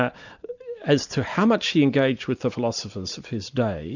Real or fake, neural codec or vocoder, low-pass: real; none; 7.2 kHz